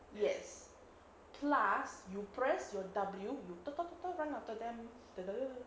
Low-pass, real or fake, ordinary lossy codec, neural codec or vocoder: none; real; none; none